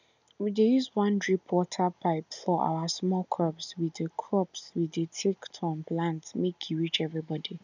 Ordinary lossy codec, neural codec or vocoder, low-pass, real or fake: none; none; 7.2 kHz; real